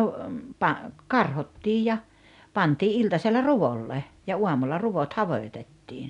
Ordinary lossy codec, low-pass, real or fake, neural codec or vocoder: none; 10.8 kHz; real; none